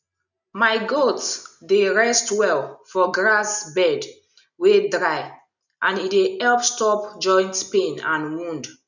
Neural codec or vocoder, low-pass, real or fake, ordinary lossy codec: none; 7.2 kHz; real; none